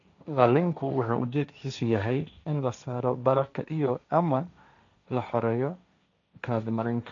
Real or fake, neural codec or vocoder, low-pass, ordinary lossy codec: fake; codec, 16 kHz, 1.1 kbps, Voila-Tokenizer; 7.2 kHz; none